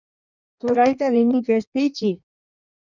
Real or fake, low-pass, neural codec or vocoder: fake; 7.2 kHz; codec, 16 kHz in and 24 kHz out, 1.1 kbps, FireRedTTS-2 codec